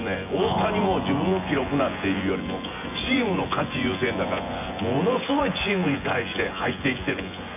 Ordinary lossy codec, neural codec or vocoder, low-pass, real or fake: none; vocoder, 24 kHz, 100 mel bands, Vocos; 3.6 kHz; fake